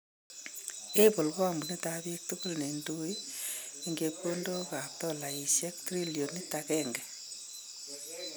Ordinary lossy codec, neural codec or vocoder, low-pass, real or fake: none; none; none; real